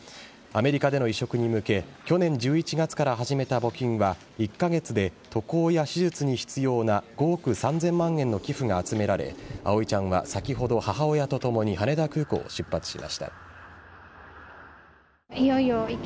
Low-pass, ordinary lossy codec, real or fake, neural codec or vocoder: none; none; real; none